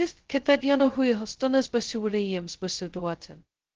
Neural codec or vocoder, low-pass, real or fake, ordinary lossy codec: codec, 16 kHz, 0.2 kbps, FocalCodec; 7.2 kHz; fake; Opus, 16 kbps